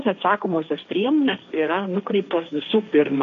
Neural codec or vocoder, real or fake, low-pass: codec, 16 kHz, 1.1 kbps, Voila-Tokenizer; fake; 7.2 kHz